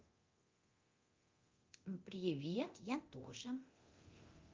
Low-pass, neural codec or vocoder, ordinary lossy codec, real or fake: 7.2 kHz; codec, 24 kHz, 0.9 kbps, DualCodec; Opus, 32 kbps; fake